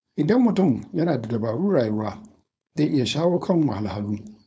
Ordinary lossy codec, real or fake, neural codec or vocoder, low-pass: none; fake; codec, 16 kHz, 4.8 kbps, FACodec; none